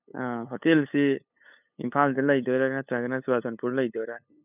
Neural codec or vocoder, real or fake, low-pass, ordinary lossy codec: codec, 16 kHz, 8 kbps, FunCodec, trained on LibriTTS, 25 frames a second; fake; 3.6 kHz; none